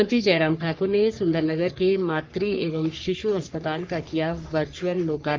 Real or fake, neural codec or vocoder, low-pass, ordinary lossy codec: fake; codec, 44.1 kHz, 3.4 kbps, Pupu-Codec; 7.2 kHz; Opus, 24 kbps